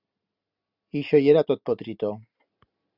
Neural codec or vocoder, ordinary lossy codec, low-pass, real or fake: none; Opus, 64 kbps; 5.4 kHz; real